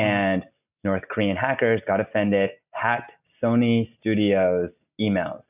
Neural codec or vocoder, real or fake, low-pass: none; real; 3.6 kHz